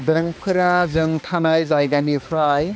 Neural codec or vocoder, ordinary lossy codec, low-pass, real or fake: codec, 16 kHz, 2 kbps, X-Codec, HuBERT features, trained on balanced general audio; none; none; fake